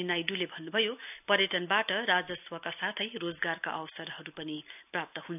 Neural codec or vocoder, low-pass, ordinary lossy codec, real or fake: none; 3.6 kHz; none; real